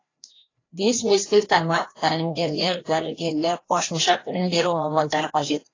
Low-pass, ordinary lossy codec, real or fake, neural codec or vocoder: 7.2 kHz; AAC, 32 kbps; fake; codec, 16 kHz, 1 kbps, FreqCodec, larger model